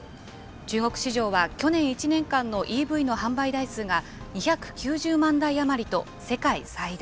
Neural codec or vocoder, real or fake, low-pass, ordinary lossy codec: none; real; none; none